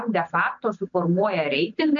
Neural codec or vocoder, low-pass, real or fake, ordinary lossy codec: none; 7.2 kHz; real; MP3, 64 kbps